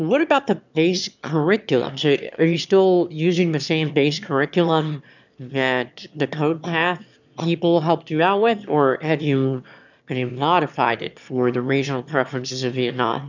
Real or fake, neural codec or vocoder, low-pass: fake; autoencoder, 22.05 kHz, a latent of 192 numbers a frame, VITS, trained on one speaker; 7.2 kHz